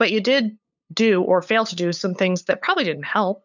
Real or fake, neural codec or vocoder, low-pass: fake; codec, 16 kHz, 8 kbps, FunCodec, trained on LibriTTS, 25 frames a second; 7.2 kHz